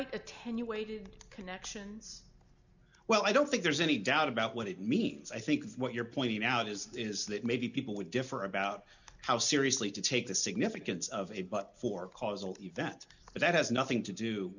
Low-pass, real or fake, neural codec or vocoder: 7.2 kHz; real; none